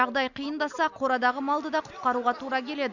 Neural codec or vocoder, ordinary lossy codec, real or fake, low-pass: none; none; real; 7.2 kHz